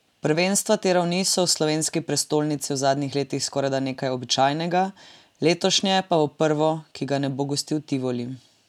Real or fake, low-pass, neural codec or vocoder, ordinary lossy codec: real; 19.8 kHz; none; none